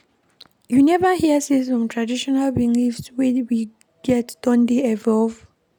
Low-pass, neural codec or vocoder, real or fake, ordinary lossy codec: none; none; real; none